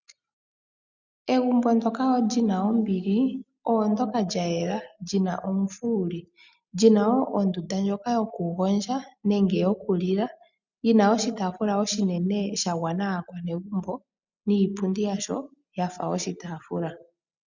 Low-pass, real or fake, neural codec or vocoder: 7.2 kHz; real; none